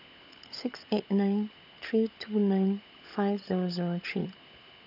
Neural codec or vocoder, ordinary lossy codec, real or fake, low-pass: codec, 16 kHz, 16 kbps, FunCodec, trained on LibriTTS, 50 frames a second; none; fake; 5.4 kHz